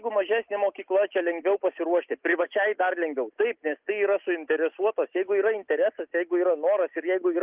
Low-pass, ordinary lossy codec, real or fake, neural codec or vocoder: 3.6 kHz; Opus, 24 kbps; real; none